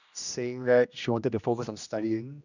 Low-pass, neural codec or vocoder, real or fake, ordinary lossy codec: 7.2 kHz; codec, 16 kHz, 1 kbps, X-Codec, HuBERT features, trained on general audio; fake; none